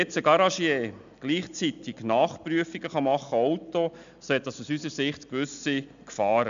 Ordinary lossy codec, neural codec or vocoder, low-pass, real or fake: AAC, 96 kbps; none; 7.2 kHz; real